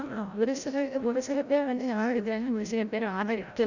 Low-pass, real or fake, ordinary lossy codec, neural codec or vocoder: 7.2 kHz; fake; none; codec, 16 kHz, 0.5 kbps, FreqCodec, larger model